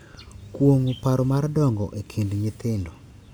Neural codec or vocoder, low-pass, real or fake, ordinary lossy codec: none; none; real; none